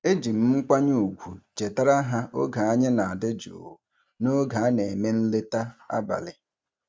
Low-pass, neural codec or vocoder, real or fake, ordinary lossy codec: none; none; real; none